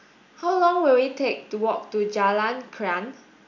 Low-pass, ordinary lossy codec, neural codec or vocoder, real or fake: 7.2 kHz; none; none; real